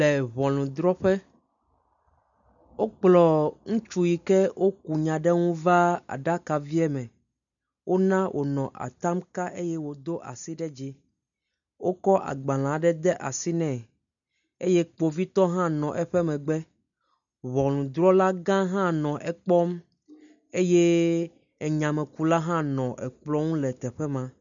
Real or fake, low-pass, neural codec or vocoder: real; 7.2 kHz; none